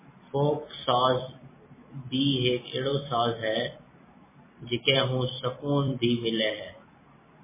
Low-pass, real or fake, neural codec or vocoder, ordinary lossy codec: 3.6 kHz; fake; vocoder, 44.1 kHz, 128 mel bands every 256 samples, BigVGAN v2; MP3, 16 kbps